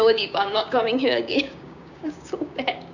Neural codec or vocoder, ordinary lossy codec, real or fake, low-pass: codec, 16 kHz, 6 kbps, DAC; none; fake; 7.2 kHz